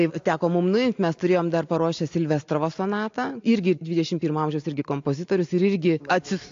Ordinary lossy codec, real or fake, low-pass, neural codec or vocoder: AAC, 48 kbps; real; 7.2 kHz; none